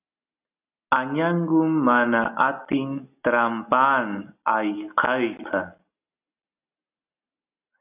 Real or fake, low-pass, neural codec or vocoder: real; 3.6 kHz; none